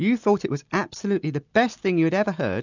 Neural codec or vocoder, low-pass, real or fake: none; 7.2 kHz; real